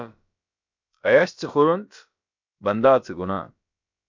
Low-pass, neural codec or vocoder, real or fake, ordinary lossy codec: 7.2 kHz; codec, 16 kHz, about 1 kbps, DyCAST, with the encoder's durations; fake; MP3, 64 kbps